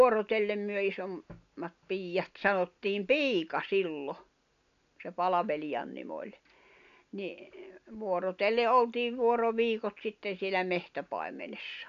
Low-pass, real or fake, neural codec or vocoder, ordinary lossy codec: 7.2 kHz; real; none; none